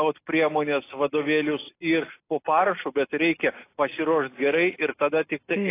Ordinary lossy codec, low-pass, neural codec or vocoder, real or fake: AAC, 24 kbps; 3.6 kHz; none; real